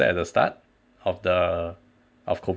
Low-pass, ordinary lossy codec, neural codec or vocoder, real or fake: none; none; none; real